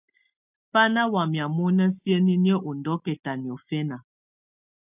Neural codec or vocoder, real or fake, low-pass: none; real; 3.6 kHz